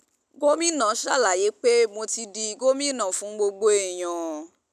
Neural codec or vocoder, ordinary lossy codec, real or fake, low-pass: none; none; real; 14.4 kHz